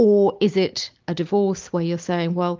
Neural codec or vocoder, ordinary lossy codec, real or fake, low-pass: none; Opus, 24 kbps; real; 7.2 kHz